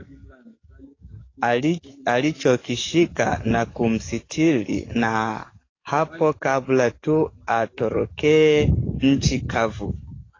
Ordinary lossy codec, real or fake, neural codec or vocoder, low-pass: AAC, 32 kbps; fake; codec, 44.1 kHz, 7.8 kbps, DAC; 7.2 kHz